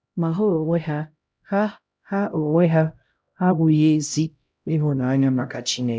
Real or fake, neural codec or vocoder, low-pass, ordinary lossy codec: fake; codec, 16 kHz, 0.5 kbps, X-Codec, HuBERT features, trained on LibriSpeech; none; none